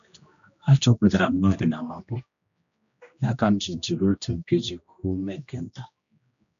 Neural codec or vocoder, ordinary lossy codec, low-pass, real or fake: codec, 16 kHz, 1 kbps, X-Codec, HuBERT features, trained on general audio; none; 7.2 kHz; fake